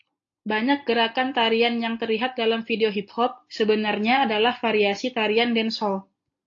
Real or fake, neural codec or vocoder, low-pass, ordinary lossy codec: real; none; 7.2 kHz; AAC, 48 kbps